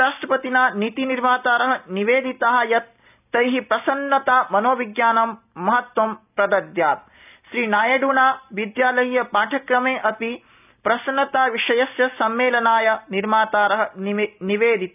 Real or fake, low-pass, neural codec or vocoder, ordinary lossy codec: real; 3.6 kHz; none; none